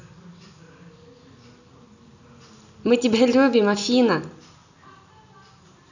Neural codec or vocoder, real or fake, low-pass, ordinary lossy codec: none; real; 7.2 kHz; none